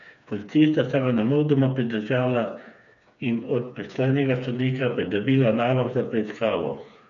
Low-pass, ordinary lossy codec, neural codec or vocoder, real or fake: 7.2 kHz; none; codec, 16 kHz, 4 kbps, FreqCodec, smaller model; fake